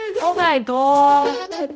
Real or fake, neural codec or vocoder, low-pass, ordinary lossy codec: fake; codec, 16 kHz, 0.5 kbps, X-Codec, HuBERT features, trained on balanced general audio; none; none